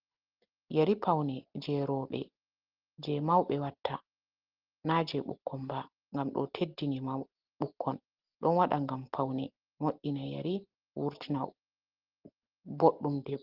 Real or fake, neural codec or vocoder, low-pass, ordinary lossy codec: real; none; 5.4 kHz; Opus, 16 kbps